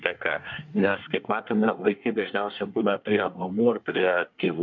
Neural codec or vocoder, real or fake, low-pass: codec, 24 kHz, 1 kbps, SNAC; fake; 7.2 kHz